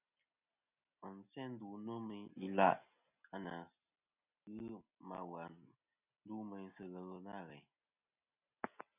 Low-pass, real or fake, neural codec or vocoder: 3.6 kHz; real; none